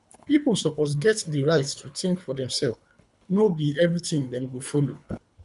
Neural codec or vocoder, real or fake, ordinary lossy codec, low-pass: codec, 24 kHz, 3 kbps, HILCodec; fake; none; 10.8 kHz